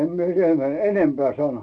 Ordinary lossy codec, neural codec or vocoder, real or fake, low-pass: none; none; real; 9.9 kHz